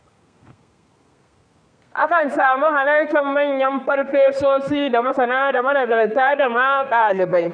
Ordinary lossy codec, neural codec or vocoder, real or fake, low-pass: none; codec, 32 kHz, 1.9 kbps, SNAC; fake; 9.9 kHz